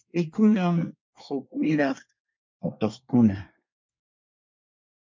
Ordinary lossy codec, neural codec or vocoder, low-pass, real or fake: MP3, 64 kbps; codec, 24 kHz, 1 kbps, SNAC; 7.2 kHz; fake